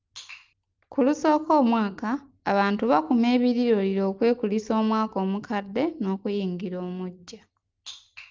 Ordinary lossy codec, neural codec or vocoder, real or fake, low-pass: Opus, 24 kbps; none; real; 7.2 kHz